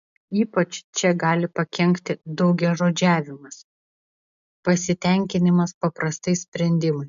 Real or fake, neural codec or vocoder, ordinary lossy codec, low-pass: real; none; AAC, 96 kbps; 7.2 kHz